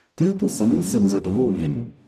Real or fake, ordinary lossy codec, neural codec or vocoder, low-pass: fake; none; codec, 44.1 kHz, 0.9 kbps, DAC; 14.4 kHz